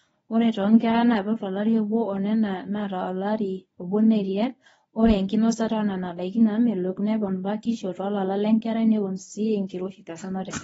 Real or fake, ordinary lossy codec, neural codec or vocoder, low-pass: fake; AAC, 24 kbps; codec, 24 kHz, 0.9 kbps, WavTokenizer, medium speech release version 1; 10.8 kHz